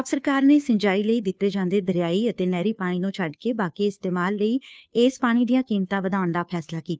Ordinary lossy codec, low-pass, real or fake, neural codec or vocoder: none; none; fake; codec, 16 kHz, 2 kbps, FunCodec, trained on Chinese and English, 25 frames a second